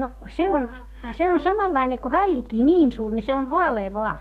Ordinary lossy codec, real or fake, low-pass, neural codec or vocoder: none; fake; 14.4 kHz; codec, 32 kHz, 1.9 kbps, SNAC